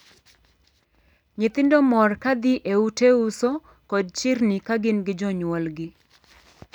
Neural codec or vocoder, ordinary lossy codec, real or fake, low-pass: none; none; real; 19.8 kHz